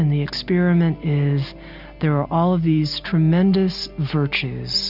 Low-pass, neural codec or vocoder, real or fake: 5.4 kHz; none; real